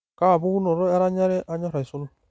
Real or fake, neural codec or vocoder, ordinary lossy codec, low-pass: real; none; none; none